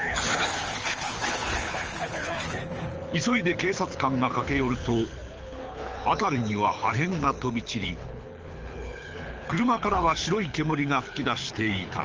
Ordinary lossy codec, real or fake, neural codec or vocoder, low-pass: Opus, 24 kbps; fake; codec, 24 kHz, 6 kbps, HILCodec; 7.2 kHz